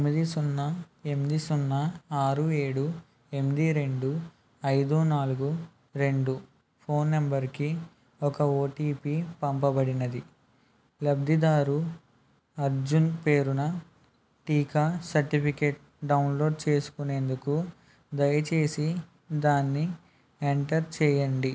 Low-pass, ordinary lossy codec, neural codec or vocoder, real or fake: none; none; none; real